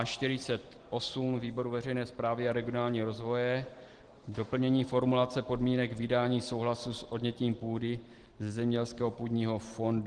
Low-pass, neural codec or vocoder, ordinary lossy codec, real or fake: 9.9 kHz; none; Opus, 16 kbps; real